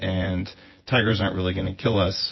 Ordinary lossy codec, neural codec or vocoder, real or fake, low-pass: MP3, 24 kbps; vocoder, 24 kHz, 100 mel bands, Vocos; fake; 7.2 kHz